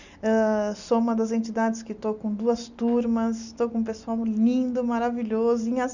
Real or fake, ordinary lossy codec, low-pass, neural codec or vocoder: real; none; 7.2 kHz; none